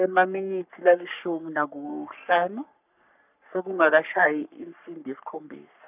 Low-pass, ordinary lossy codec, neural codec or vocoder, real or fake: 3.6 kHz; none; codec, 44.1 kHz, 3.4 kbps, Pupu-Codec; fake